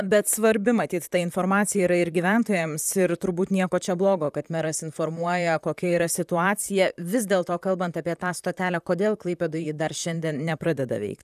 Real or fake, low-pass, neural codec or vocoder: fake; 14.4 kHz; vocoder, 44.1 kHz, 128 mel bands, Pupu-Vocoder